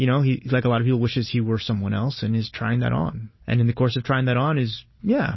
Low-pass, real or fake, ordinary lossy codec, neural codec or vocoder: 7.2 kHz; real; MP3, 24 kbps; none